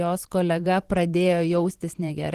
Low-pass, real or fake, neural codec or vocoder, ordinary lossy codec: 14.4 kHz; fake; vocoder, 44.1 kHz, 128 mel bands every 512 samples, BigVGAN v2; Opus, 16 kbps